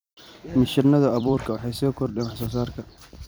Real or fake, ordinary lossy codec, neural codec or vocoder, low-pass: fake; none; vocoder, 44.1 kHz, 128 mel bands every 256 samples, BigVGAN v2; none